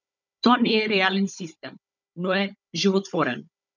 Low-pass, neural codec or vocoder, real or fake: 7.2 kHz; codec, 16 kHz, 16 kbps, FunCodec, trained on Chinese and English, 50 frames a second; fake